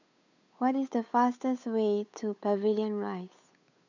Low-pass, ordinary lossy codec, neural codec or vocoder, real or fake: 7.2 kHz; none; codec, 16 kHz, 8 kbps, FunCodec, trained on Chinese and English, 25 frames a second; fake